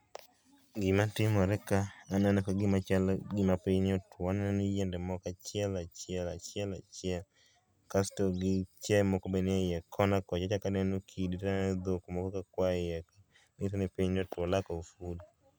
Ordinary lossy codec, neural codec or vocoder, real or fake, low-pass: none; none; real; none